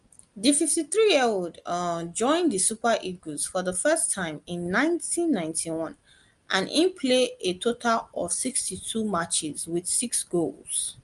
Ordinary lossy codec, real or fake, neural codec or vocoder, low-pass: Opus, 32 kbps; real; none; 10.8 kHz